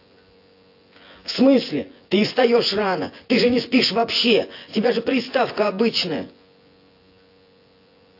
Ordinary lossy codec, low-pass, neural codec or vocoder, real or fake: AAC, 32 kbps; 5.4 kHz; vocoder, 24 kHz, 100 mel bands, Vocos; fake